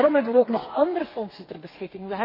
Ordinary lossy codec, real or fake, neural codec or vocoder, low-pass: MP3, 24 kbps; fake; codec, 32 kHz, 1.9 kbps, SNAC; 5.4 kHz